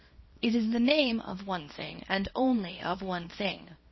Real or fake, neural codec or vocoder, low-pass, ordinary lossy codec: fake; codec, 24 kHz, 0.9 kbps, WavTokenizer, small release; 7.2 kHz; MP3, 24 kbps